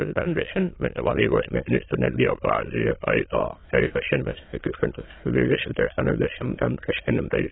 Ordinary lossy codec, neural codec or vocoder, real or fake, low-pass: AAC, 16 kbps; autoencoder, 22.05 kHz, a latent of 192 numbers a frame, VITS, trained on many speakers; fake; 7.2 kHz